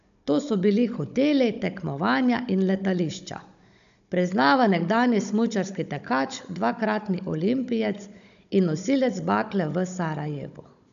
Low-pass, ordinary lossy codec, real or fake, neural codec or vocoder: 7.2 kHz; none; fake; codec, 16 kHz, 16 kbps, FunCodec, trained on Chinese and English, 50 frames a second